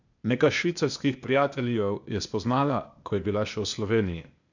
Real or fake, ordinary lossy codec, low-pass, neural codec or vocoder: fake; none; 7.2 kHz; codec, 16 kHz, 0.8 kbps, ZipCodec